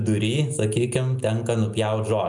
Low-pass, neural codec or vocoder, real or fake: 10.8 kHz; none; real